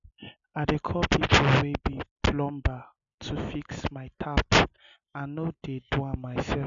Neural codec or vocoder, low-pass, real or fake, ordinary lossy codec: none; 7.2 kHz; real; MP3, 96 kbps